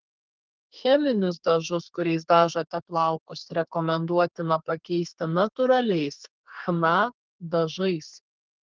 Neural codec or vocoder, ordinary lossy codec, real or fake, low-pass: codec, 32 kHz, 1.9 kbps, SNAC; Opus, 32 kbps; fake; 7.2 kHz